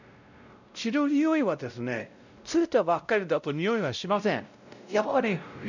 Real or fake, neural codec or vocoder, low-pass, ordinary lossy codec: fake; codec, 16 kHz, 0.5 kbps, X-Codec, WavLM features, trained on Multilingual LibriSpeech; 7.2 kHz; none